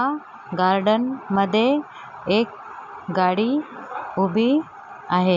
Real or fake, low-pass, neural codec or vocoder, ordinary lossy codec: real; 7.2 kHz; none; none